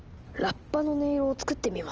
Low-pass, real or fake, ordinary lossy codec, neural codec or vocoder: 7.2 kHz; real; Opus, 24 kbps; none